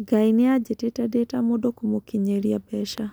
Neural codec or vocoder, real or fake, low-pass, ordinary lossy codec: none; real; none; none